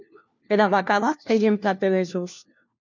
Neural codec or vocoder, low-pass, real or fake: codec, 16 kHz, 1 kbps, FunCodec, trained on LibriTTS, 50 frames a second; 7.2 kHz; fake